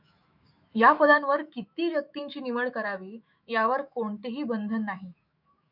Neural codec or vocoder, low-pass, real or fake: autoencoder, 48 kHz, 128 numbers a frame, DAC-VAE, trained on Japanese speech; 5.4 kHz; fake